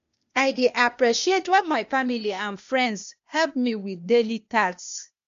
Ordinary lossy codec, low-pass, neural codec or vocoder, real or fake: MP3, 48 kbps; 7.2 kHz; codec, 16 kHz, 0.8 kbps, ZipCodec; fake